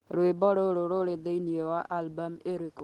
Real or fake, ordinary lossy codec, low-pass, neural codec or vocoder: real; Opus, 16 kbps; 19.8 kHz; none